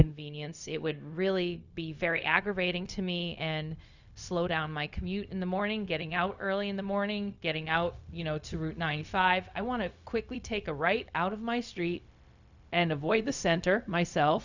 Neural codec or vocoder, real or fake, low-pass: codec, 16 kHz, 0.4 kbps, LongCat-Audio-Codec; fake; 7.2 kHz